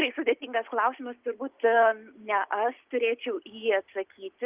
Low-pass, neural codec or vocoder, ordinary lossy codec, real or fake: 3.6 kHz; none; Opus, 32 kbps; real